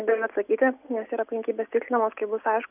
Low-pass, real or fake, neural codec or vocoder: 3.6 kHz; real; none